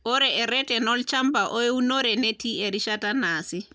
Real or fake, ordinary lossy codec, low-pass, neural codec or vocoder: real; none; none; none